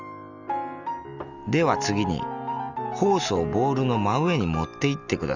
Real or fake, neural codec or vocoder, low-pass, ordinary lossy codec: real; none; 7.2 kHz; none